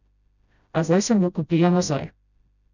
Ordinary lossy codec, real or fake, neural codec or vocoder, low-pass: none; fake; codec, 16 kHz, 0.5 kbps, FreqCodec, smaller model; 7.2 kHz